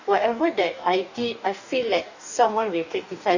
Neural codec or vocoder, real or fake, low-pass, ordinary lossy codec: codec, 44.1 kHz, 2.6 kbps, DAC; fake; 7.2 kHz; none